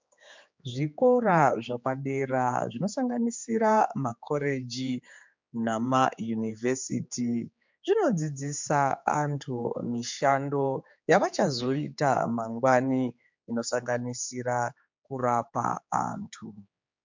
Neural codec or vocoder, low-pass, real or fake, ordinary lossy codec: codec, 16 kHz, 4 kbps, X-Codec, HuBERT features, trained on general audio; 7.2 kHz; fake; MP3, 64 kbps